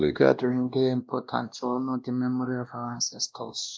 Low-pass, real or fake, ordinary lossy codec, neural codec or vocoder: none; fake; none; codec, 16 kHz, 1 kbps, X-Codec, WavLM features, trained on Multilingual LibriSpeech